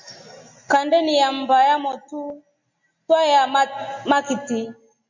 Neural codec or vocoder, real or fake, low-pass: none; real; 7.2 kHz